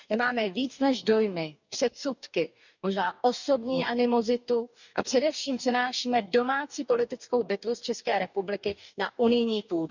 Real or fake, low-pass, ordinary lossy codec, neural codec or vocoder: fake; 7.2 kHz; none; codec, 44.1 kHz, 2.6 kbps, DAC